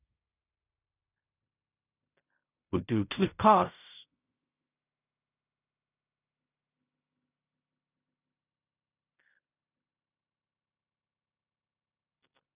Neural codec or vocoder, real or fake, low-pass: codec, 16 kHz in and 24 kHz out, 0.4 kbps, LongCat-Audio-Codec, fine tuned four codebook decoder; fake; 3.6 kHz